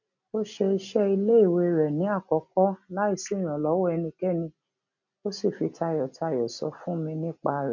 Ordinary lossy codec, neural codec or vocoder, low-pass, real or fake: none; none; 7.2 kHz; real